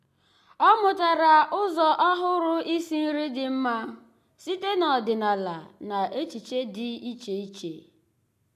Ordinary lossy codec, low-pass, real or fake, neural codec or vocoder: AAC, 96 kbps; 14.4 kHz; real; none